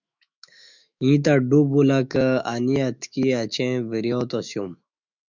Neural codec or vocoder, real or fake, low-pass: autoencoder, 48 kHz, 128 numbers a frame, DAC-VAE, trained on Japanese speech; fake; 7.2 kHz